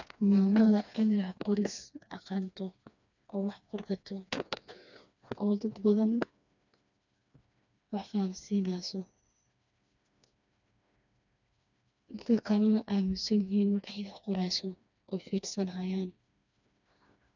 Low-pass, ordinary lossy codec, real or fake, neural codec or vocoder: 7.2 kHz; none; fake; codec, 16 kHz, 2 kbps, FreqCodec, smaller model